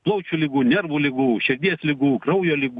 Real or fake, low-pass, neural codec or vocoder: real; 10.8 kHz; none